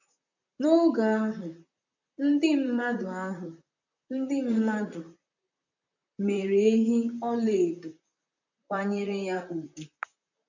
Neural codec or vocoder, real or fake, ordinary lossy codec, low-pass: vocoder, 44.1 kHz, 128 mel bands, Pupu-Vocoder; fake; none; 7.2 kHz